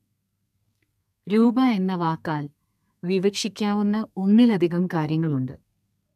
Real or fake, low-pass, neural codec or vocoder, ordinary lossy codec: fake; 14.4 kHz; codec, 32 kHz, 1.9 kbps, SNAC; none